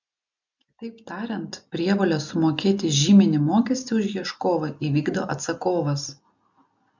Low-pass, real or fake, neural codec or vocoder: 7.2 kHz; real; none